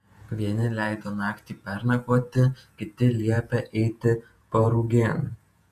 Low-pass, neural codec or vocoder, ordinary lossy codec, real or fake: 14.4 kHz; vocoder, 48 kHz, 128 mel bands, Vocos; MP3, 96 kbps; fake